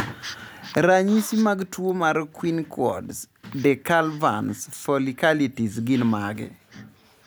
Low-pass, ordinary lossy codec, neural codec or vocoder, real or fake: none; none; none; real